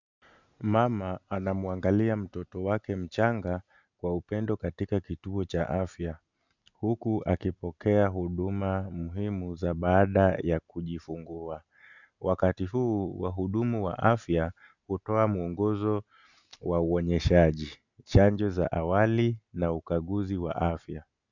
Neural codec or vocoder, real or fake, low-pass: none; real; 7.2 kHz